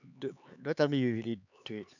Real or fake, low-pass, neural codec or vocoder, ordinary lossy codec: fake; 7.2 kHz; codec, 16 kHz, 4 kbps, X-Codec, HuBERT features, trained on LibriSpeech; none